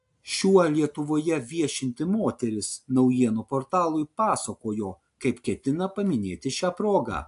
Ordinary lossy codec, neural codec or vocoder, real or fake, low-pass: AAC, 64 kbps; none; real; 10.8 kHz